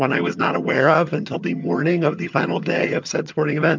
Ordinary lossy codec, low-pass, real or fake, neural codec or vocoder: MP3, 64 kbps; 7.2 kHz; fake; vocoder, 22.05 kHz, 80 mel bands, HiFi-GAN